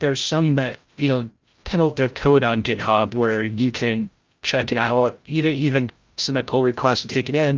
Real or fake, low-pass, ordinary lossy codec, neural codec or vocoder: fake; 7.2 kHz; Opus, 24 kbps; codec, 16 kHz, 0.5 kbps, FreqCodec, larger model